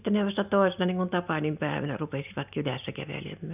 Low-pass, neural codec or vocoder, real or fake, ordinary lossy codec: 3.6 kHz; none; real; none